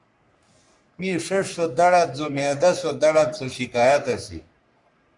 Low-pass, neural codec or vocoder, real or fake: 10.8 kHz; codec, 44.1 kHz, 3.4 kbps, Pupu-Codec; fake